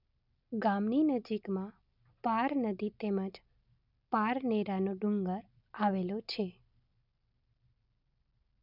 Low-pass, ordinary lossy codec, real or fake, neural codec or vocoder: 5.4 kHz; none; real; none